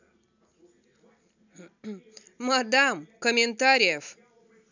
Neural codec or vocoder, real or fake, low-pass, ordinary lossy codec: none; real; 7.2 kHz; none